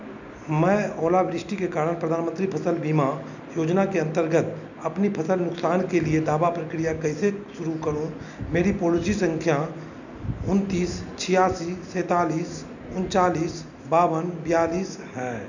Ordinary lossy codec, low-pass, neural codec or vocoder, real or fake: none; 7.2 kHz; none; real